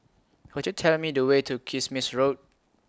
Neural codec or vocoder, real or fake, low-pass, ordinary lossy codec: none; real; none; none